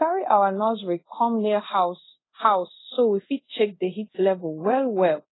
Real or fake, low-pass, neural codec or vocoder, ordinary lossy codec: fake; 7.2 kHz; codec, 24 kHz, 0.5 kbps, DualCodec; AAC, 16 kbps